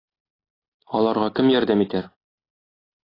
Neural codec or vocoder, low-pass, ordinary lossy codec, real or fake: none; 5.4 kHz; AAC, 24 kbps; real